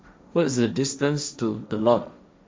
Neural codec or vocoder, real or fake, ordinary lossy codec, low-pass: codec, 16 kHz, 1.1 kbps, Voila-Tokenizer; fake; none; none